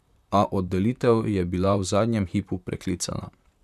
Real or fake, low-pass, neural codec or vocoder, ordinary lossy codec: fake; 14.4 kHz; vocoder, 44.1 kHz, 128 mel bands, Pupu-Vocoder; none